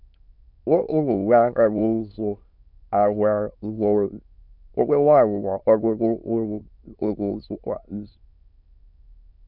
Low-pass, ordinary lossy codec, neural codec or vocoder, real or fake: 5.4 kHz; none; autoencoder, 22.05 kHz, a latent of 192 numbers a frame, VITS, trained on many speakers; fake